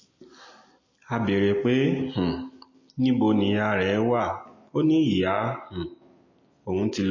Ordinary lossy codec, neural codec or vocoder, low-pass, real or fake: MP3, 32 kbps; none; 7.2 kHz; real